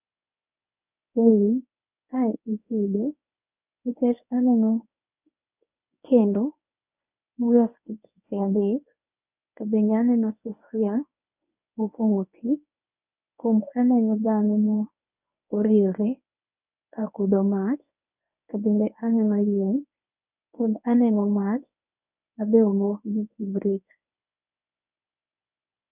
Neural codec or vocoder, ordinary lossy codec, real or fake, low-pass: codec, 24 kHz, 0.9 kbps, WavTokenizer, medium speech release version 1; none; fake; 3.6 kHz